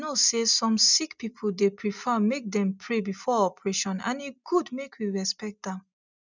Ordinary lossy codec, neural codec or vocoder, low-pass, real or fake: none; none; 7.2 kHz; real